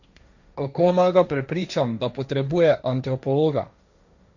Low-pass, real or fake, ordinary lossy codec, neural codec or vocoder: 7.2 kHz; fake; none; codec, 16 kHz, 1.1 kbps, Voila-Tokenizer